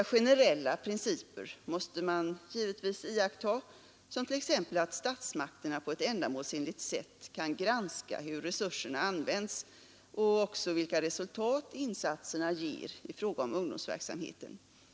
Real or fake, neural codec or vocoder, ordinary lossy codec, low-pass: real; none; none; none